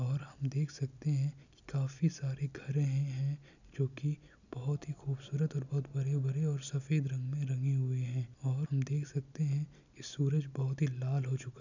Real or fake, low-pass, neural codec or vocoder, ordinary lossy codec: real; 7.2 kHz; none; none